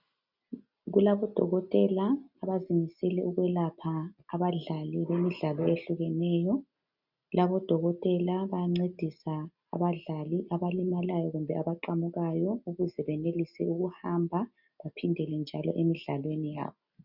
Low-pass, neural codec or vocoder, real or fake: 5.4 kHz; none; real